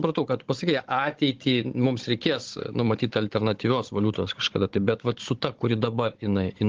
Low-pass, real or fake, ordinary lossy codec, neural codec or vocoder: 7.2 kHz; fake; Opus, 16 kbps; codec, 16 kHz, 16 kbps, FunCodec, trained on Chinese and English, 50 frames a second